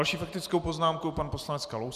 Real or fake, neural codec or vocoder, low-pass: real; none; 14.4 kHz